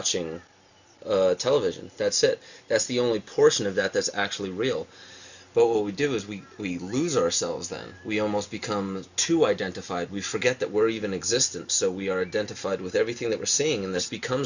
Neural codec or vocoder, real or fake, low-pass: none; real; 7.2 kHz